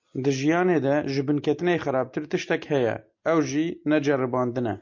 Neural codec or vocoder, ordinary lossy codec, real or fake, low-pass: none; MP3, 48 kbps; real; 7.2 kHz